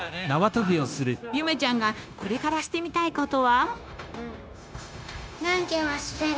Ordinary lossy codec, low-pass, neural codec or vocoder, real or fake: none; none; codec, 16 kHz, 0.9 kbps, LongCat-Audio-Codec; fake